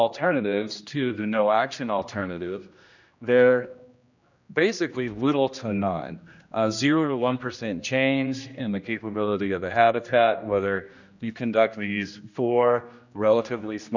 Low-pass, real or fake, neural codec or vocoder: 7.2 kHz; fake; codec, 16 kHz, 1 kbps, X-Codec, HuBERT features, trained on general audio